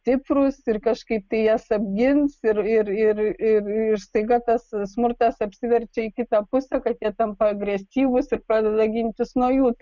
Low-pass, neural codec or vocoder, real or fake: 7.2 kHz; none; real